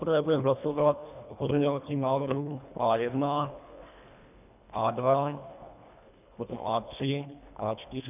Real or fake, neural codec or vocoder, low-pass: fake; codec, 24 kHz, 1.5 kbps, HILCodec; 3.6 kHz